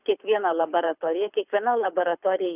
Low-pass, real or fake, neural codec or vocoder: 3.6 kHz; fake; codec, 24 kHz, 6 kbps, HILCodec